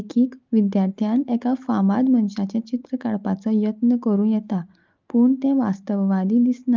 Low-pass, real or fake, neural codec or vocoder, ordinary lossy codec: 7.2 kHz; real; none; Opus, 32 kbps